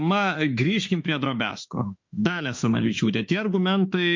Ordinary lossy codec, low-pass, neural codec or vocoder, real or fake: MP3, 48 kbps; 7.2 kHz; autoencoder, 48 kHz, 32 numbers a frame, DAC-VAE, trained on Japanese speech; fake